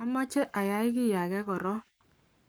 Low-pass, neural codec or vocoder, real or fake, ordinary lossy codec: none; codec, 44.1 kHz, 7.8 kbps, Pupu-Codec; fake; none